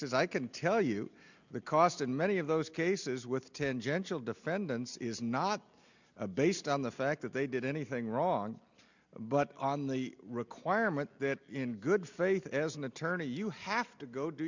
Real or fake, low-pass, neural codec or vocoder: real; 7.2 kHz; none